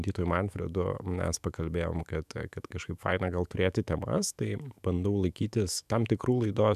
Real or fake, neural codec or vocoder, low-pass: fake; autoencoder, 48 kHz, 128 numbers a frame, DAC-VAE, trained on Japanese speech; 14.4 kHz